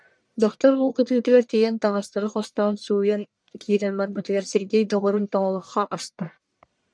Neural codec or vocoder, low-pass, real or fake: codec, 44.1 kHz, 1.7 kbps, Pupu-Codec; 9.9 kHz; fake